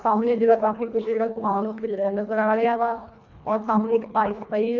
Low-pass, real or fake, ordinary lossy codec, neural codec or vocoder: 7.2 kHz; fake; none; codec, 24 kHz, 1.5 kbps, HILCodec